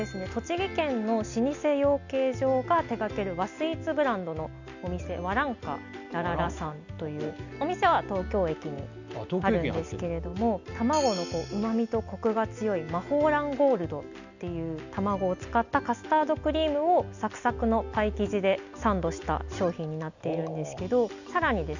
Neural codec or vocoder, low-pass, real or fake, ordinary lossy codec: none; 7.2 kHz; real; none